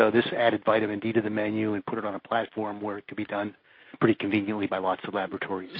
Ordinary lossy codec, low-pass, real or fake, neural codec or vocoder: MP3, 32 kbps; 5.4 kHz; real; none